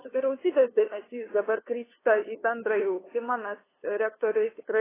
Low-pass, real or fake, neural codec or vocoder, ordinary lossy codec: 3.6 kHz; fake; codec, 16 kHz, 2 kbps, FunCodec, trained on LibriTTS, 25 frames a second; AAC, 16 kbps